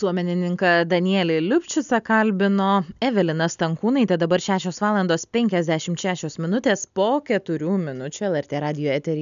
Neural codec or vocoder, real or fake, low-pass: none; real; 7.2 kHz